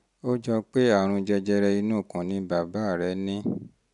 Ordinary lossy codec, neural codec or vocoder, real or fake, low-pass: none; none; real; 10.8 kHz